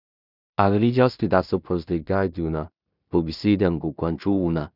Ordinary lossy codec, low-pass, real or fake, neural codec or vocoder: none; 5.4 kHz; fake; codec, 16 kHz in and 24 kHz out, 0.4 kbps, LongCat-Audio-Codec, two codebook decoder